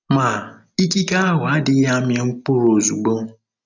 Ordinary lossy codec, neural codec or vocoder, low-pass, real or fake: none; none; 7.2 kHz; real